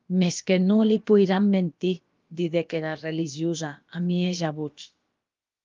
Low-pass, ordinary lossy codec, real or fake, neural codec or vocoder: 7.2 kHz; Opus, 32 kbps; fake; codec, 16 kHz, about 1 kbps, DyCAST, with the encoder's durations